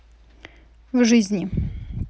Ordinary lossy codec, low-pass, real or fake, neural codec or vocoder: none; none; real; none